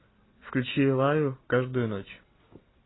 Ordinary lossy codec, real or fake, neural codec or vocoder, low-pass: AAC, 16 kbps; real; none; 7.2 kHz